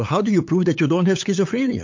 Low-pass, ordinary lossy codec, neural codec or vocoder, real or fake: 7.2 kHz; MP3, 48 kbps; codec, 16 kHz, 8 kbps, FunCodec, trained on LibriTTS, 25 frames a second; fake